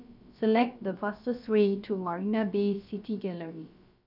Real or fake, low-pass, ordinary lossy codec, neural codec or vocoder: fake; 5.4 kHz; none; codec, 16 kHz, about 1 kbps, DyCAST, with the encoder's durations